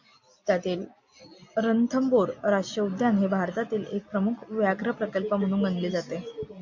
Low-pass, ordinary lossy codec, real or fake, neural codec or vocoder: 7.2 kHz; MP3, 64 kbps; real; none